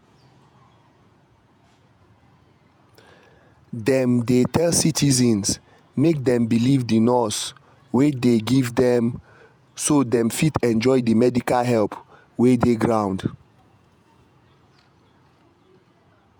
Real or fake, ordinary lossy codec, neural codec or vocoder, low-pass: fake; none; vocoder, 48 kHz, 128 mel bands, Vocos; none